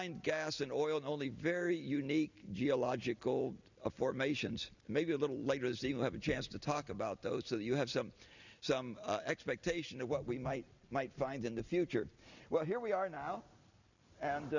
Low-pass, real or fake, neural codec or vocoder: 7.2 kHz; real; none